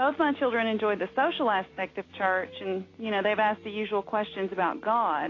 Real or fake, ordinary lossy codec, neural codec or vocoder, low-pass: real; AAC, 32 kbps; none; 7.2 kHz